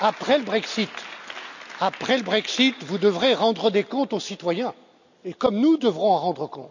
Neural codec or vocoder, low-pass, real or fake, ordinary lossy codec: none; 7.2 kHz; real; none